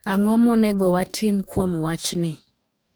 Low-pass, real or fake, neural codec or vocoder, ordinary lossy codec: none; fake; codec, 44.1 kHz, 2.6 kbps, DAC; none